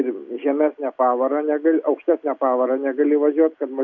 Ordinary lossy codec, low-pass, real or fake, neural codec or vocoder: MP3, 64 kbps; 7.2 kHz; real; none